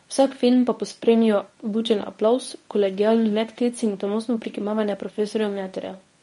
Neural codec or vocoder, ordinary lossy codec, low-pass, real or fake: codec, 24 kHz, 0.9 kbps, WavTokenizer, medium speech release version 1; MP3, 48 kbps; 10.8 kHz; fake